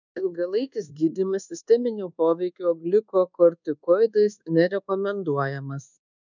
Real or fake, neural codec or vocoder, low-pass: fake; codec, 24 kHz, 1.2 kbps, DualCodec; 7.2 kHz